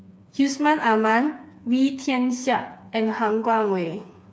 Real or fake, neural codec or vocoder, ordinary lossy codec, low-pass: fake; codec, 16 kHz, 4 kbps, FreqCodec, smaller model; none; none